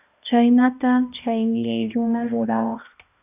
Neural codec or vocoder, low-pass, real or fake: codec, 16 kHz, 1 kbps, X-Codec, HuBERT features, trained on balanced general audio; 3.6 kHz; fake